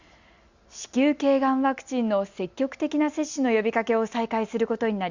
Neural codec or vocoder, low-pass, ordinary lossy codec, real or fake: none; 7.2 kHz; Opus, 64 kbps; real